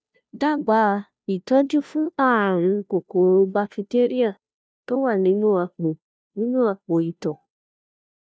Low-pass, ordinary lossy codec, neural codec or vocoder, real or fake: none; none; codec, 16 kHz, 0.5 kbps, FunCodec, trained on Chinese and English, 25 frames a second; fake